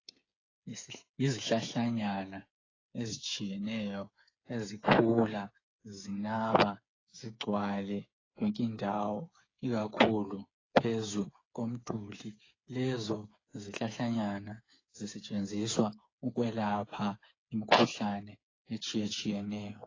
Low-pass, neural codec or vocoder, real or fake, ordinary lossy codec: 7.2 kHz; codec, 16 kHz, 8 kbps, FreqCodec, smaller model; fake; AAC, 32 kbps